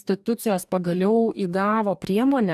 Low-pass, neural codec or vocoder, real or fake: 14.4 kHz; codec, 44.1 kHz, 2.6 kbps, DAC; fake